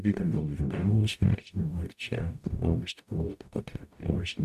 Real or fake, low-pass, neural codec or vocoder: fake; 14.4 kHz; codec, 44.1 kHz, 0.9 kbps, DAC